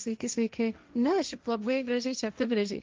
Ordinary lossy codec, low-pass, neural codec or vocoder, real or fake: Opus, 24 kbps; 7.2 kHz; codec, 16 kHz, 1.1 kbps, Voila-Tokenizer; fake